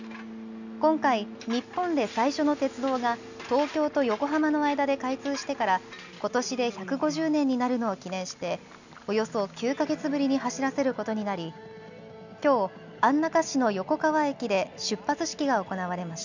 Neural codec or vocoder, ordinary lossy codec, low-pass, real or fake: none; none; 7.2 kHz; real